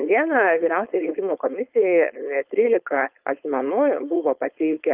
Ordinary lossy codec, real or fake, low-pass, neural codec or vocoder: Opus, 24 kbps; fake; 3.6 kHz; codec, 16 kHz, 4.8 kbps, FACodec